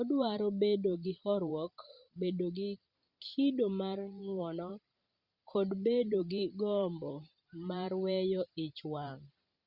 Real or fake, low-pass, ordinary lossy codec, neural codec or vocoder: fake; 5.4 kHz; Opus, 64 kbps; vocoder, 44.1 kHz, 128 mel bands every 512 samples, BigVGAN v2